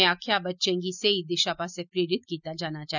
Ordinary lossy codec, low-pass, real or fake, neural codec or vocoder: none; 7.2 kHz; real; none